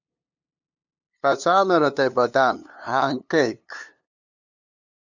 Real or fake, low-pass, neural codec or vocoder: fake; 7.2 kHz; codec, 16 kHz, 2 kbps, FunCodec, trained on LibriTTS, 25 frames a second